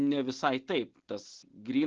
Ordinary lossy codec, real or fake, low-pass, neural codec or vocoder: Opus, 16 kbps; real; 7.2 kHz; none